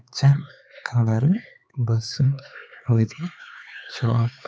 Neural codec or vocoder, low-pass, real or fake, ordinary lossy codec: codec, 16 kHz, 4 kbps, X-Codec, HuBERT features, trained on LibriSpeech; none; fake; none